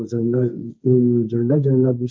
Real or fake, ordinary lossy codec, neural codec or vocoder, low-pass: fake; none; codec, 16 kHz, 1.1 kbps, Voila-Tokenizer; none